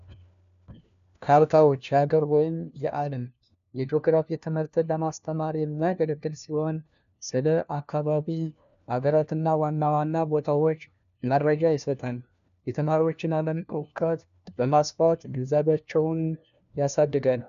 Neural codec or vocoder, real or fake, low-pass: codec, 16 kHz, 1 kbps, FunCodec, trained on LibriTTS, 50 frames a second; fake; 7.2 kHz